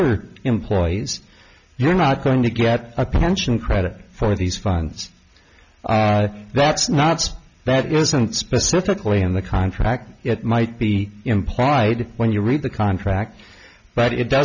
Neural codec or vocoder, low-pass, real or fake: none; 7.2 kHz; real